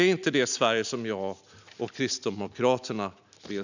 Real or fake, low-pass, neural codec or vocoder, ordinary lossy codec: real; 7.2 kHz; none; none